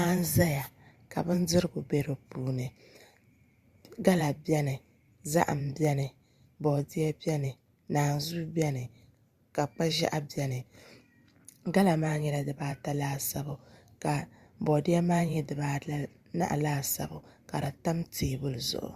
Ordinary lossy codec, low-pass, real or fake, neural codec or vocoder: Opus, 64 kbps; 14.4 kHz; fake; vocoder, 44.1 kHz, 128 mel bands every 512 samples, BigVGAN v2